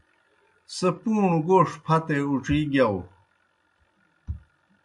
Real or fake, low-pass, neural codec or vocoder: real; 10.8 kHz; none